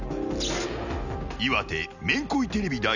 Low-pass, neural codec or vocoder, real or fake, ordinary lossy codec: 7.2 kHz; none; real; none